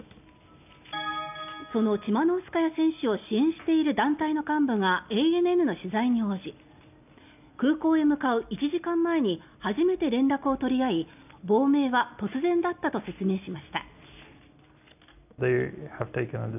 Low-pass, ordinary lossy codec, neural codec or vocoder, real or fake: 3.6 kHz; none; none; real